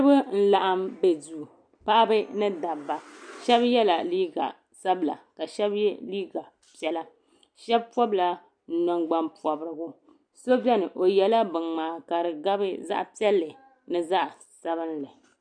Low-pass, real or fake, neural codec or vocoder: 9.9 kHz; real; none